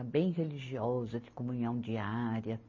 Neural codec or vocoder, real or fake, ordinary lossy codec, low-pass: none; real; MP3, 32 kbps; 7.2 kHz